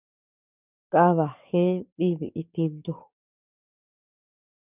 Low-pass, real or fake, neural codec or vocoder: 3.6 kHz; fake; codec, 44.1 kHz, 7.8 kbps, DAC